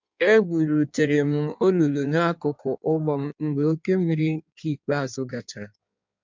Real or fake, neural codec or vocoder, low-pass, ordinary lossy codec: fake; codec, 16 kHz in and 24 kHz out, 1.1 kbps, FireRedTTS-2 codec; 7.2 kHz; MP3, 64 kbps